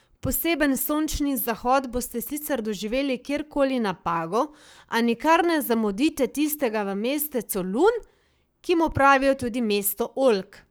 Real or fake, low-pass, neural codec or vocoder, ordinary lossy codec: fake; none; codec, 44.1 kHz, 7.8 kbps, Pupu-Codec; none